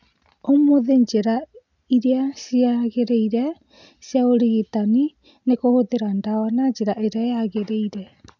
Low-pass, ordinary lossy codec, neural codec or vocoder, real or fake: 7.2 kHz; none; none; real